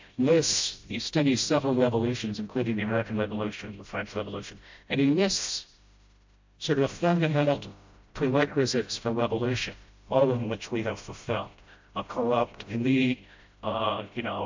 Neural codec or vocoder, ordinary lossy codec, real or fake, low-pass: codec, 16 kHz, 0.5 kbps, FreqCodec, smaller model; MP3, 48 kbps; fake; 7.2 kHz